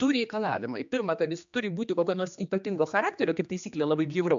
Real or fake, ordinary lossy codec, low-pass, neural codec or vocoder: fake; MP3, 64 kbps; 7.2 kHz; codec, 16 kHz, 2 kbps, X-Codec, HuBERT features, trained on general audio